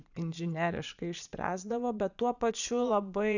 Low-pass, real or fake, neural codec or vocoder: 7.2 kHz; fake; vocoder, 22.05 kHz, 80 mel bands, Vocos